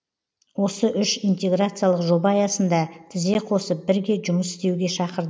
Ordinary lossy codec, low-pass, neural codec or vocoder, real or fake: none; none; none; real